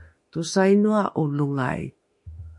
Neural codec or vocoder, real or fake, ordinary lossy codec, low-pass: autoencoder, 48 kHz, 32 numbers a frame, DAC-VAE, trained on Japanese speech; fake; MP3, 48 kbps; 10.8 kHz